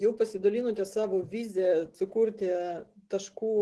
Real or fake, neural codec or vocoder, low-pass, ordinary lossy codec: real; none; 10.8 kHz; Opus, 16 kbps